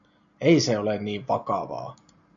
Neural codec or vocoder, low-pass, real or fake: none; 7.2 kHz; real